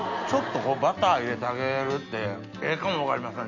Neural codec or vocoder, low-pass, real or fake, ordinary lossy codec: none; 7.2 kHz; real; none